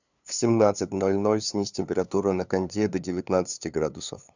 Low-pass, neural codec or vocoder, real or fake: 7.2 kHz; codec, 16 kHz, 2 kbps, FunCodec, trained on LibriTTS, 25 frames a second; fake